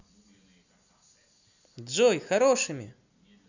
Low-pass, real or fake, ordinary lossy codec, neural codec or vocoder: 7.2 kHz; real; none; none